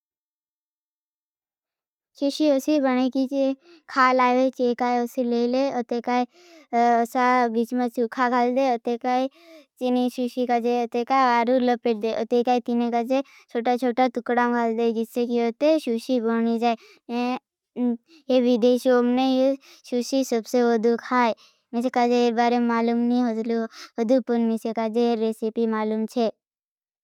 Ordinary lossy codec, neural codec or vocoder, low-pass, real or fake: none; none; 19.8 kHz; real